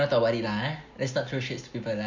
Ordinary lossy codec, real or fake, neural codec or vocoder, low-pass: MP3, 48 kbps; real; none; 7.2 kHz